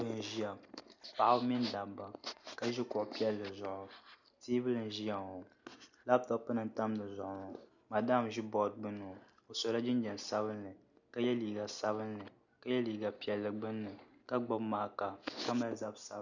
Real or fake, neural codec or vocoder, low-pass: real; none; 7.2 kHz